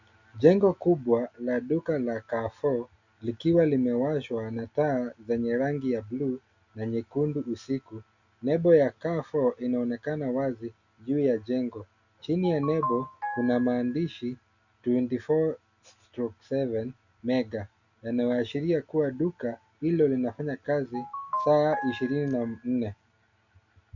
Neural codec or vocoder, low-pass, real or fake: none; 7.2 kHz; real